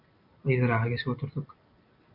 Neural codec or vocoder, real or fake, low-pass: none; real; 5.4 kHz